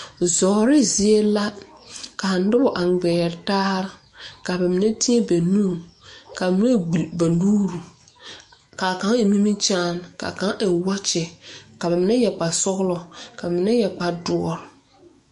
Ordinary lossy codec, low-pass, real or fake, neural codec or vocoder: MP3, 48 kbps; 14.4 kHz; fake; autoencoder, 48 kHz, 128 numbers a frame, DAC-VAE, trained on Japanese speech